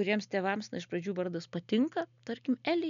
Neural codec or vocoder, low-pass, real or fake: none; 7.2 kHz; real